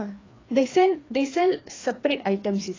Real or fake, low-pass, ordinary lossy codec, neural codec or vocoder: fake; 7.2 kHz; AAC, 32 kbps; codec, 16 kHz, 2 kbps, FreqCodec, larger model